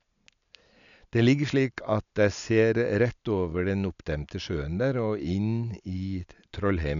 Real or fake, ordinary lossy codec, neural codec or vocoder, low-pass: real; none; none; 7.2 kHz